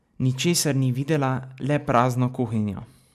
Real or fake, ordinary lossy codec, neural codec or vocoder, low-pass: real; none; none; 14.4 kHz